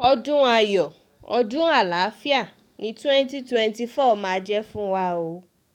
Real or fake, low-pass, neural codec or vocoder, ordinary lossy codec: fake; 19.8 kHz; codec, 44.1 kHz, 7.8 kbps, DAC; none